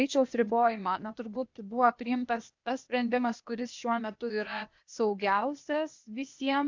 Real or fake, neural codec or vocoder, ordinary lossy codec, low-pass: fake; codec, 16 kHz, 0.8 kbps, ZipCodec; MP3, 64 kbps; 7.2 kHz